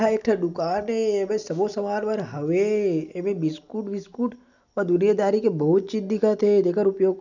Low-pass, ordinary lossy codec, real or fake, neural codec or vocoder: 7.2 kHz; none; real; none